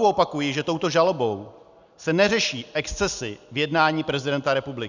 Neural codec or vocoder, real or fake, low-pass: none; real; 7.2 kHz